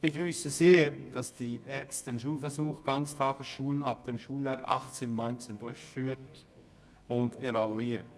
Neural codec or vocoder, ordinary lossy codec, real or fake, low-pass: codec, 24 kHz, 0.9 kbps, WavTokenizer, medium music audio release; none; fake; none